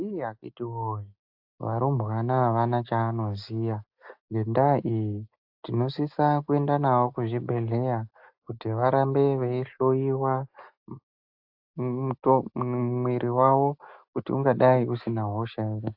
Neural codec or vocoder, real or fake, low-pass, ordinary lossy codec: none; real; 5.4 kHz; AAC, 48 kbps